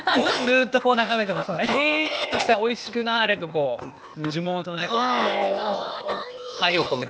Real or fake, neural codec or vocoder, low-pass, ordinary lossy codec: fake; codec, 16 kHz, 0.8 kbps, ZipCodec; none; none